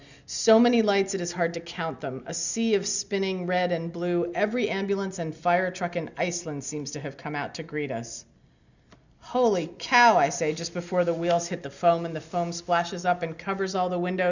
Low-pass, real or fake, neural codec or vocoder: 7.2 kHz; real; none